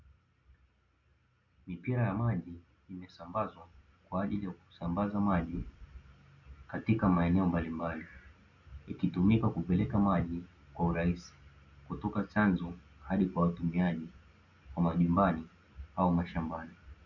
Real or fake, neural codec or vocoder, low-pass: real; none; 7.2 kHz